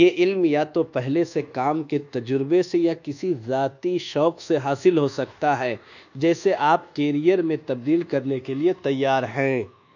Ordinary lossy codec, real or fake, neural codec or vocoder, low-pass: none; fake; codec, 24 kHz, 1.2 kbps, DualCodec; 7.2 kHz